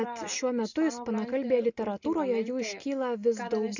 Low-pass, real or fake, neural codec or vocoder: 7.2 kHz; real; none